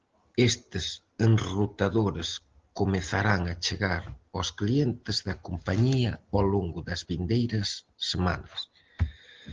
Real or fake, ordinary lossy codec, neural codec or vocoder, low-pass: real; Opus, 16 kbps; none; 7.2 kHz